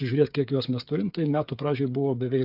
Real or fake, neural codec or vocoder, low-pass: fake; codec, 16 kHz, 8 kbps, FreqCodec, smaller model; 5.4 kHz